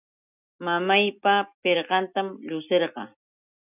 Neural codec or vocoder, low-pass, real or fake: none; 3.6 kHz; real